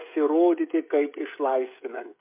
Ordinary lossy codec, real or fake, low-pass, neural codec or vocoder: MP3, 32 kbps; fake; 3.6 kHz; codec, 16 kHz, 16 kbps, FreqCodec, smaller model